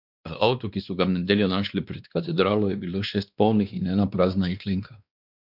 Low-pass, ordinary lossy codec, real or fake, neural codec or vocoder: 5.4 kHz; none; fake; codec, 16 kHz, 2 kbps, X-Codec, WavLM features, trained on Multilingual LibriSpeech